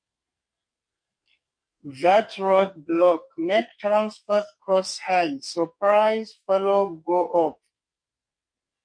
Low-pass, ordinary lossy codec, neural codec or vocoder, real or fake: 9.9 kHz; MP3, 48 kbps; codec, 44.1 kHz, 2.6 kbps, SNAC; fake